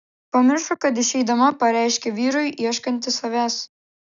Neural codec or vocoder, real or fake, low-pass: none; real; 7.2 kHz